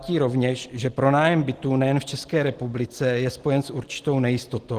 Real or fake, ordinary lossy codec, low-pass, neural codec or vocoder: real; Opus, 16 kbps; 14.4 kHz; none